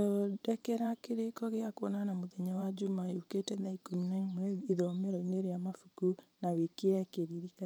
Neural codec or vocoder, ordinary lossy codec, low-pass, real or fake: vocoder, 44.1 kHz, 128 mel bands every 512 samples, BigVGAN v2; none; none; fake